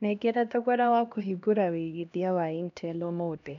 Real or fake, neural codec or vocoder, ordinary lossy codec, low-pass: fake; codec, 16 kHz, 1 kbps, X-Codec, HuBERT features, trained on LibriSpeech; none; 7.2 kHz